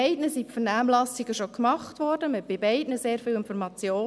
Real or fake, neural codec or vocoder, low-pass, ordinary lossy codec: real; none; none; none